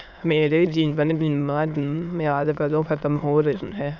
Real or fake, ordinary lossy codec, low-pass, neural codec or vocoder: fake; none; 7.2 kHz; autoencoder, 22.05 kHz, a latent of 192 numbers a frame, VITS, trained on many speakers